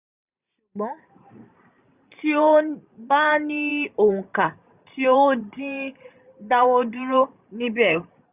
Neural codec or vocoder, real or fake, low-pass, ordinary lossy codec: vocoder, 44.1 kHz, 128 mel bands every 512 samples, BigVGAN v2; fake; 3.6 kHz; none